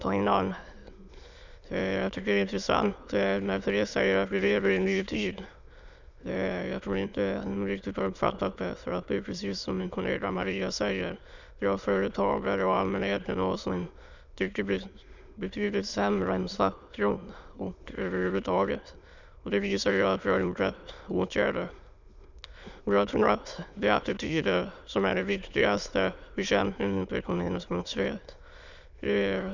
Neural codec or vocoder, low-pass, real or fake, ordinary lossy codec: autoencoder, 22.05 kHz, a latent of 192 numbers a frame, VITS, trained on many speakers; 7.2 kHz; fake; none